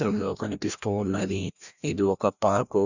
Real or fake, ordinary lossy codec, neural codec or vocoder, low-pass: fake; none; codec, 16 kHz, 1 kbps, FreqCodec, larger model; 7.2 kHz